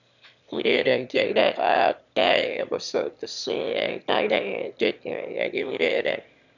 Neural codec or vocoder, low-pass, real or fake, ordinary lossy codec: autoencoder, 22.05 kHz, a latent of 192 numbers a frame, VITS, trained on one speaker; 7.2 kHz; fake; none